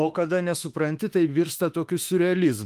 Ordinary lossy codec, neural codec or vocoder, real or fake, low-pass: Opus, 32 kbps; autoencoder, 48 kHz, 32 numbers a frame, DAC-VAE, trained on Japanese speech; fake; 14.4 kHz